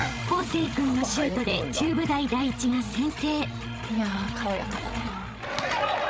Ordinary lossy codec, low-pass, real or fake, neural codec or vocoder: none; none; fake; codec, 16 kHz, 16 kbps, FreqCodec, larger model